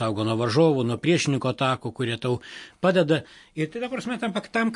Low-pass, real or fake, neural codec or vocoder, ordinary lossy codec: 10.8 kHz; real; none; MP3, 48 kbps